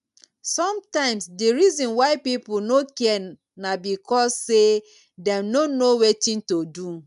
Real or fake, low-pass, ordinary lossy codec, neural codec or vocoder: real; 10.8 kHz; none; none